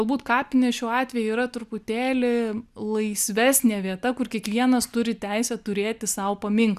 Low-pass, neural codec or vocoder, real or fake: 14.4 kHz; none; real